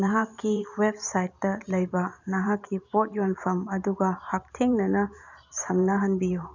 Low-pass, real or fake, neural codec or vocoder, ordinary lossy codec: 7.2 kHz; fake; vocoder, 44.1 kHz, 128 mel bands every 512 samples, BigVGAN v2; none